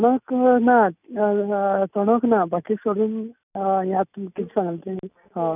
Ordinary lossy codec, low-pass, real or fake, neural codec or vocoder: none; 3.6 kHz; real; none